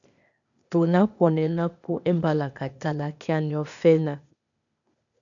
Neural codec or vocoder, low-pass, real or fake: codec, 16 kHz, 0.8 kbps, ZipCodec; 7.2 kHz; fake